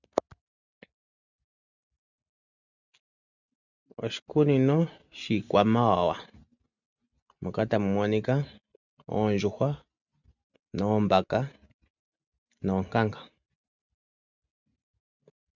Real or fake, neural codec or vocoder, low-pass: real; none; 7.2 kHz